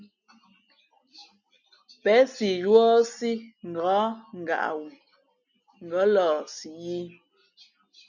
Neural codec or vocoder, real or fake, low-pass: none; real; 7.2 kHz